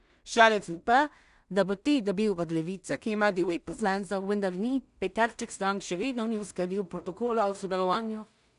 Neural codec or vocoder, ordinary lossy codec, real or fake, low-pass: codec, 16 kHz in and 24 kHz out, 0.4 kbps, LongCat-Audio-Codec, two codebook decoder; none; fake; 10.8 kHz